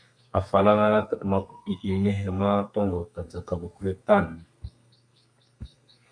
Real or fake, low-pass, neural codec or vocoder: fake; 9.9 kHz; codec, 32 kHz, 1.9 kbps, SNAC